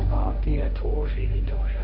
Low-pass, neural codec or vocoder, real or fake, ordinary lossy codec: 5.4 kHz; codec, 16 kHz, 1.1 kbps, Voila-Tokenizer; fake; none